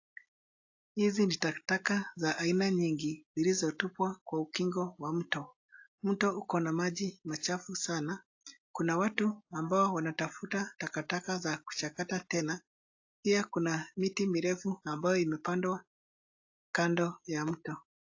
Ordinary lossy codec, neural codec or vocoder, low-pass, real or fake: AAC, 48 kbps; none; 7.2 kHz; real